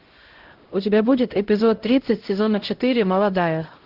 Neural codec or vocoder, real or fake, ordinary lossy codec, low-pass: codec, 16 kHz, 0.5 kbps, X-Codec, HuBERT features, trained on LibriSpeech; fake; Opus, 16 kbps; 5.4 kHz